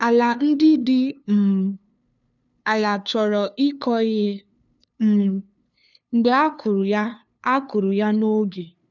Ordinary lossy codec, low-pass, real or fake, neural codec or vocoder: none; 7.2 kHz; fake; codec, 16 kHz, 2 kbps, FunCodec, trained on LibriTTS, 25 frames a second